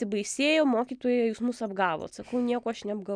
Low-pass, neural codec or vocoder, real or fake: 9.9 kHz; none; real